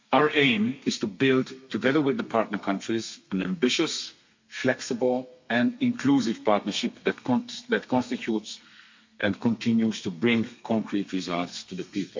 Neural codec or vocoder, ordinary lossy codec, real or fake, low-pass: codec, 32 kHz, 1.9 kbps, SNAC; MP3, 48 kbps; fake; 7.2 kHz